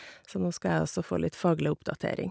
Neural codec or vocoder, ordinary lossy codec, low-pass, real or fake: none; none; none; real